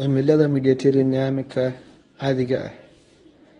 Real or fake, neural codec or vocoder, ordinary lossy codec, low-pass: fake; codec, 24 kHz, 0.9 kbps, WavTokenizer, medium speech release version 1; AAC, 32 kbps; 10.8 kHz